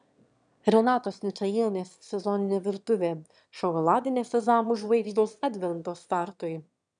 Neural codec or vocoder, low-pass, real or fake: autoencoder, 22.05 kHz, a latent of 192 numbers a frame, VITS, trained on one speaker; 9.9 kHz; fake